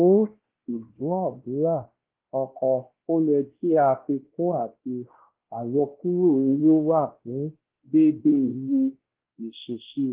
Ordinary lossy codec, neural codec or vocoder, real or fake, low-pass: Opus, 16 kbps; codec, 16 kHz, 1 kbps, X-Codec, WavLM features, trained on Multilingual LibriSpeech; fake; 3.6 kHz